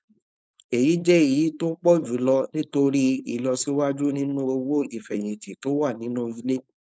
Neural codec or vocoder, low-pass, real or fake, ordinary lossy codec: codec, 16 kHz, 4.8 kbps, FACodec; none; fake; none